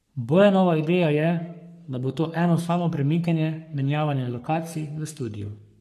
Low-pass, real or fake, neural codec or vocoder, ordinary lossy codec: 14.4 kHz; fake; codec, 44.1 kHz, 3.4 kbps, Pupu-Codec; none